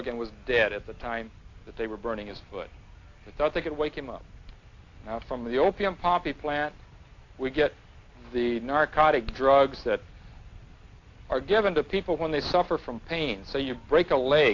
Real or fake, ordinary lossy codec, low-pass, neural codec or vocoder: real; AAC, 48 kbps; 7.2 kHz; none